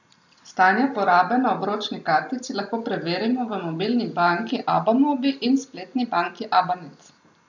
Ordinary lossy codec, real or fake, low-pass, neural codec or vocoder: none; real; 7.2 kHz; none